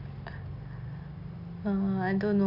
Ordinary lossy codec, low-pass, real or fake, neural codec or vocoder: none; 5.4 kHz; real; none